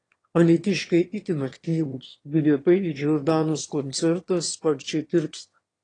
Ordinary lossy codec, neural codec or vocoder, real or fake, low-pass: AAC, 32 kbps; autoencoder, 22.05 kHz, a latent of 192 numbers a frame, VITS, trained on one speaker; fake; 9.9 kHz